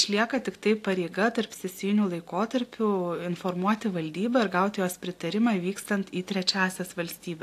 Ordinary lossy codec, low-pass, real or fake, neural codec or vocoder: AAC, 64 kbps; 14.4 kHz; real; none